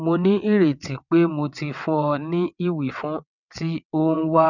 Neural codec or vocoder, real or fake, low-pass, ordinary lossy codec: vocoder, 22.05 kHz, 80 mel bands, WaveNeXt; fake; 7.2 kHz; none